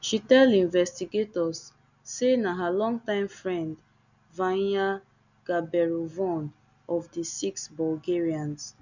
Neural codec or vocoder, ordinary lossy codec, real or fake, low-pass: none; none; real; 7.2 kHz